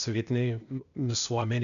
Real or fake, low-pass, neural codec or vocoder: fake; 7.2 kHz; codec, 16 kHz, 0.8 kbps, ZipCodec